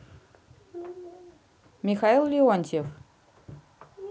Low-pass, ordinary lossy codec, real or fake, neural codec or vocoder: none; none; real; none